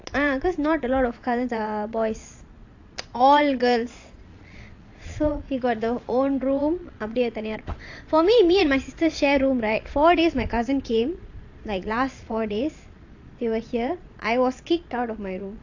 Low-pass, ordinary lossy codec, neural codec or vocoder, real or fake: 7.2 kHz; AAC, 48 kbps; vocoder, 22.05 kHz, 80 mel bands, Vocos; fake